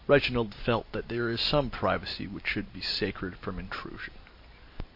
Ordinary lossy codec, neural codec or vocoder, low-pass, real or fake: MP3, 32 kbps; vocoder, 44.1 kHz, 128 mel bands every 512 samples, BigVGAN v2; 5.4 kHz; fake